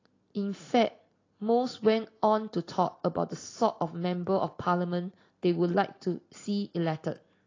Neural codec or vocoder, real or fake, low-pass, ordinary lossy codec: none; real; 7.2 kHz; AAC, 32 kbps